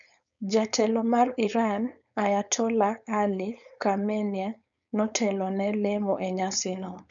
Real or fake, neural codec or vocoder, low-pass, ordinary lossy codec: fake; codec, 16 kHz, 4.8 kbps, FACodec; 7.2 kHz; none